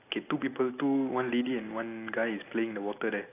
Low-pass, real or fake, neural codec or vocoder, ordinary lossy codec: 3.6 kHz; real; none; AAC, 24 kbps